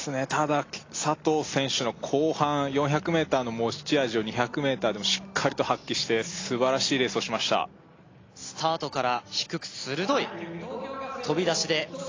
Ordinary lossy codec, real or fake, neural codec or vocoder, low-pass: AAC, 32 kbps; real; none; 7.2 kHz